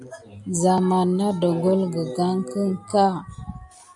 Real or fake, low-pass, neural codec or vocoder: real; 10.8 kHz; none